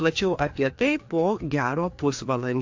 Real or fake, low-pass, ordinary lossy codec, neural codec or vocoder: fake; 7.2 kHz; AAC, 48 kbps; codec, 16 kHz, 2 kbps, FreqCodec, larger model